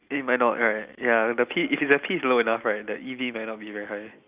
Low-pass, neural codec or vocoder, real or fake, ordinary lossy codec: 3.6 kHz; none; real; Opus, 64 kbps